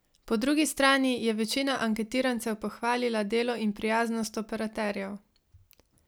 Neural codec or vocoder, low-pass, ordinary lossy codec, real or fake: none; none; none; real